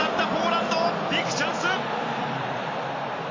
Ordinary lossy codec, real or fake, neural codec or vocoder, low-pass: MP3, 64 kbps; fake; vocoder, 44.1 kHz, 128 mel bands every 512 samples, BigVGAN v2; 7.2 kHz